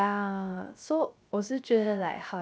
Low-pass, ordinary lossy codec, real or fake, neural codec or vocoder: none; none; fake; codec, 16 kHz, 0.3 kbps, FocalCodec